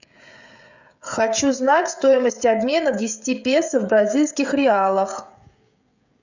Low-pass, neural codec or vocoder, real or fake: 7.2 kHz; codec, 16 kHz, 16 kbps, FreqCodec, smaller model; fake